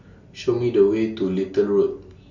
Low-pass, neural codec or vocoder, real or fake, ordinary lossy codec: 7.2 kHz; none; real; Opus, 64 kbps